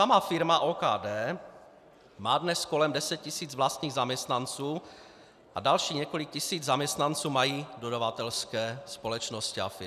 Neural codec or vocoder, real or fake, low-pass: none; real; 14.4 kHz